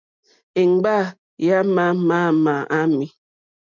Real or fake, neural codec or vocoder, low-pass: real; none; 7.2 kHz